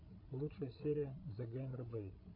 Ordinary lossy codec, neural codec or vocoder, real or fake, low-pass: AAC, 32 kbps; codec, 16 kHz, 16 kbps, FreqCodec, larger model; fake; 5.4 kHz